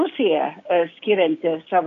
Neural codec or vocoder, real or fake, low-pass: codec, 16 kHz, 6 kbps, DAC; fake; 7.2 kHz